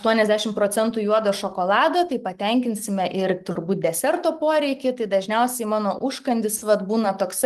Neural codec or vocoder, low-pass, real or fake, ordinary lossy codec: none; 14.4 kHz; real; Opus, 24 kbps